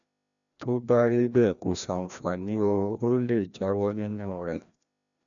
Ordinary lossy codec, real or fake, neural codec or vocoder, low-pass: none; fake; codec, 16 kHz, 1 kbps, FreqCodec, larger model; 7.2 kHz